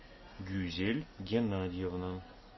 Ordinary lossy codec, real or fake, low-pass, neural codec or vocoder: MP3, 24 kbps; real; 7.2 kHz; none